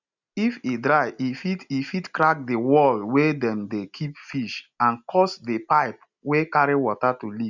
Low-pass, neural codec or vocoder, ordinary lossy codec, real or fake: 7.2 kHz; none; none; real